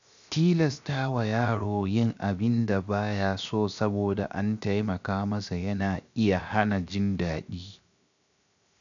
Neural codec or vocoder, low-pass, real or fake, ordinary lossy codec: codec, 16 kHz, 0.7 kbps, FocalCodec; 7.2 kHz; fake; none